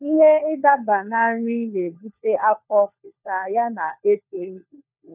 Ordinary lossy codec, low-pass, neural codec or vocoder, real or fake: none; 3.6 kHz; codec, 16 kHz, 4 kbps, FunCodec, trained on LibriTTS, 50 frames a second; fake